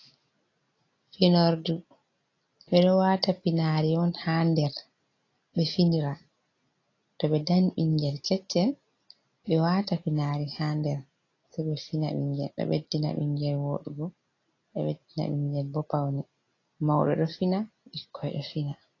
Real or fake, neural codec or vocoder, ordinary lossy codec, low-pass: real; none; AAC, 32 kbps; 7.2 kHz